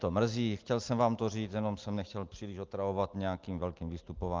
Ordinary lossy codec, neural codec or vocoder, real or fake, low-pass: Opus, 32 kbps; none; real; 7.2 kHz